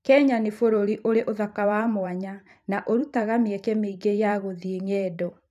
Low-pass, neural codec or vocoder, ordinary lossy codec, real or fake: 14.4 kHz; vocoder, 44.1 kHz, 128 mel bands every 512 samples, BigVGAN v2; none; fake